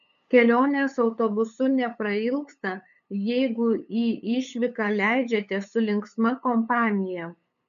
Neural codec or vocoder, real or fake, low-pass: codec, 16 kHz, 8 kbps, FunCodec, trained on LibriTTS, 25 frames a second; fake; 7.2 kHz